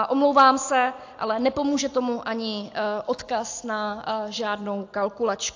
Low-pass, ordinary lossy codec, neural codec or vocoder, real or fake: 7.2 kHz; AAC, 48 kbps; none; real